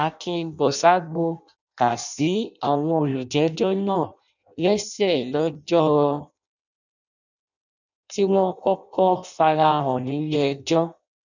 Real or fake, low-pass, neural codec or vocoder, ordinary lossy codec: fake; 7.2 kHz; codec, 16 kHz in and 24 kHz out, 0.6 kbps, FireRedTTS-2 codec; none